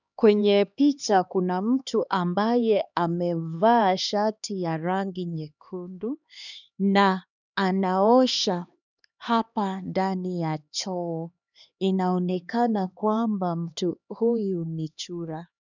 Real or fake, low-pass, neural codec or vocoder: fake; 7.2 kHz; codec, 16 kHz, 2 kbps, X-Codec, HuBERT features, trained on LibriSpeech